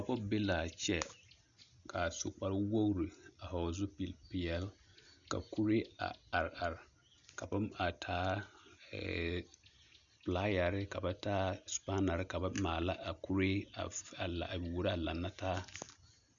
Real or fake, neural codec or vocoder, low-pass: real; none; 7.2 kHz